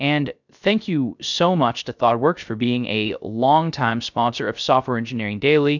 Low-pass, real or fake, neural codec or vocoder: 7.2 kHz; fake; codec, 16 kHz, 0.3 kbps, FocalCodec